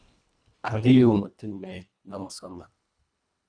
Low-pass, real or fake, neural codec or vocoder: 9.9 kHz; fake; codec, 24 kHz, 1.5 kbps, HILCodec